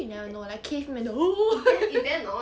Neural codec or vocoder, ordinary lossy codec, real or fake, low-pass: none; none; real; none